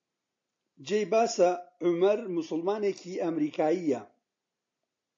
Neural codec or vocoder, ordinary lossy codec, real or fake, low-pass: none; AAC, 48 kbps; real; 7.2 kHz